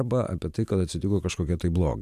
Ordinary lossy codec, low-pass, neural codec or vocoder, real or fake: MP3, 96 kbps; 14.4 kHz; vocoder, 44.1 kHz, 128 mel bands every 512 samples, BigVGAN v2; fake